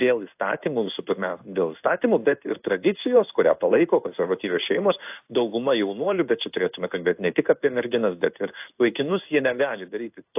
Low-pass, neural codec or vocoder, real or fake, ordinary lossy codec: 3.6 kHz; codec, 16 kHz in and 24 kHz out, 1 kbps, XY-Tokenizer; fake; AAC, 32 kbps